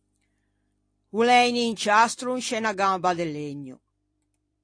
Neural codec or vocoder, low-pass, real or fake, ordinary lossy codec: vocoder, 44.1 kHz, 128 mel bands every 256 samples, BigVGAN v2; 9.9 kHz; fake; AAC, 48 kbps